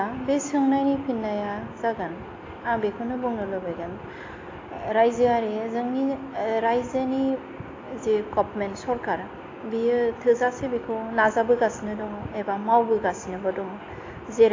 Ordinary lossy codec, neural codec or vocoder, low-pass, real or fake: AAC, 32 kbps; none; 7.2 kHz; real